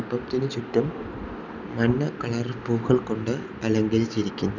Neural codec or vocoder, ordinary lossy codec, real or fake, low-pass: none; none; real; 7.2 kHz